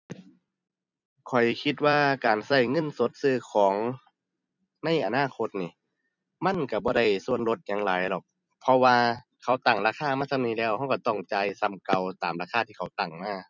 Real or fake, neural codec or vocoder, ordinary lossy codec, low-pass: fake; vocoder, 44.1 kHz, 128 mel bands every 256 samples, BigVGAN v2; none; 7.2 kHz